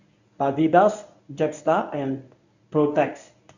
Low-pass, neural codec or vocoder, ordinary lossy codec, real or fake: 7.2 kHz; codec, 24 kHz, 0.9 kbps, WavTokenizer, medium speech release version 1; none; fake